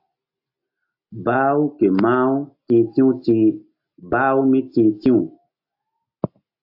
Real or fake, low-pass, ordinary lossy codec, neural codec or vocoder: real; 5.4 kHz; AAC, 48 kbps; none